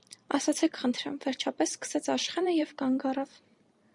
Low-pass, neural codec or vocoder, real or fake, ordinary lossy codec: 10.8 kHz; vocoder, 44.1 kHz, 128 mel bands every 256 samples, BigVGAN v2; fake; Opus, 64 kbps